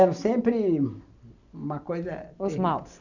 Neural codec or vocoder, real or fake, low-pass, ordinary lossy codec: none; real; 7.2 kHz; none